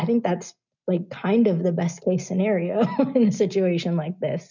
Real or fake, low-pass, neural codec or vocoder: real; 7.2 kHz; none